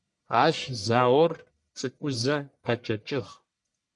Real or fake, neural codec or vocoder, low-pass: fake; codec, 44.1 kHz, 1.7 kbps, Pupu-Codec; 10.8 kHz